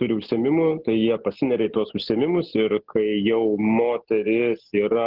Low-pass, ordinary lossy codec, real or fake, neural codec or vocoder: 5.4 kHz; Opus, 16 kbps; real; none